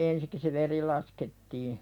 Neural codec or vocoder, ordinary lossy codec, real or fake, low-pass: none; none; real; 19.8 kHz